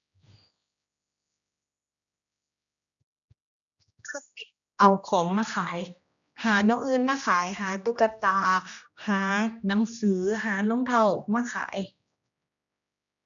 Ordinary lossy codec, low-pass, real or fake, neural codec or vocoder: none; 7.2 kHz; fake; codec, 16 kHz, 1 kbps, X-Codec, HuBERT features, trained on general audio